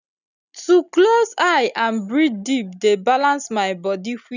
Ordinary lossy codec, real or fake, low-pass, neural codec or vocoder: none; real; 7.2 kHz; none